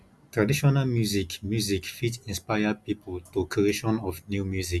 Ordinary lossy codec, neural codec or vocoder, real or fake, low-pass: none; none; real; none